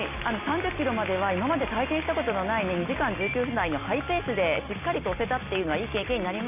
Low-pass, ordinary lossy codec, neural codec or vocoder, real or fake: 3.6 kHz; none; none; real